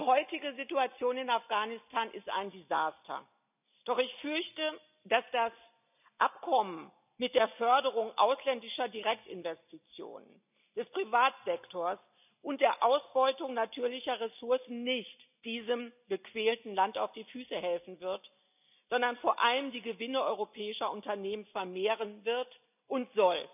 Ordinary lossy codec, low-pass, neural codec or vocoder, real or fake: none; 3.6 kHz; none; real